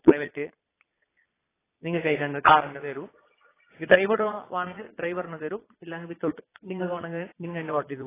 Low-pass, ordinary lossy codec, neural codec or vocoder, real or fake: 3.6 kHz; AAC, 16 kbps; vocoder, 22.05 kHz, 80 mel bands, Vocos; fake